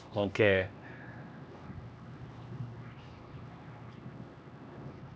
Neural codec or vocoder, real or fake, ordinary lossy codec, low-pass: codec, 16 kHz, 2 kbps, X-Codec, HuBERT features, trained on LibriSpeech; fake; none; none